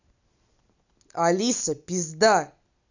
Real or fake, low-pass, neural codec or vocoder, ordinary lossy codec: real; 7.2 kHz; none; none